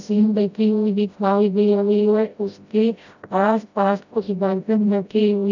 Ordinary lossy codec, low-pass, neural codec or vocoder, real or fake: none; 7.2 kHz; codec, 16 kHz, 0.5 kbps, FreqCodec, smaller model; fake